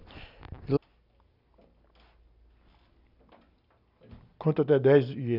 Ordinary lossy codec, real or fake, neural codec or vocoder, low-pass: none; real; none; 5.4 kHz